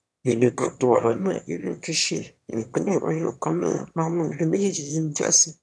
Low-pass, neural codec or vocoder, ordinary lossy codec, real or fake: none; autoencoder, 22.05 kHz, a latent of 192 numbers a frame, VITS, trained on one speaker; none; fake